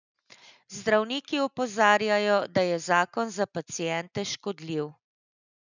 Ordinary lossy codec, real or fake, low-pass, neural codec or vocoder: none; real; 7.2 kHz; none